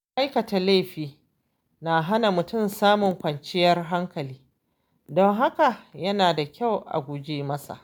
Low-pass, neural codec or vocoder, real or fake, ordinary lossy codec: none; none; real; none